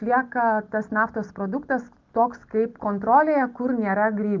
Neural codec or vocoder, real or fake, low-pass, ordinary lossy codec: none; real; 7.2 kHz; Opus, 32 kbps